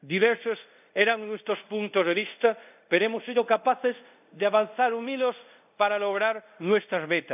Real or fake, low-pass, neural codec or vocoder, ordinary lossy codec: fake; 3.6 kHz; codec, 24 kHz, 0.5 kbps, DualCodec; none